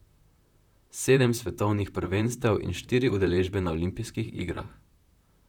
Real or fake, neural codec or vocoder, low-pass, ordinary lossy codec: fake; vocoder, 44.1 kHz, 128 mel bands, Pupu-Vocoder; 19.8 kHz; none